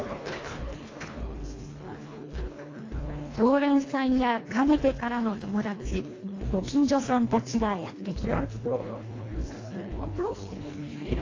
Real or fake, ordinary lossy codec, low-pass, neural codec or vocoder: fake; AAC, 32 kbps; 7.2 kHz; codec, 24 kHz, 1.5 kbps, HILCodec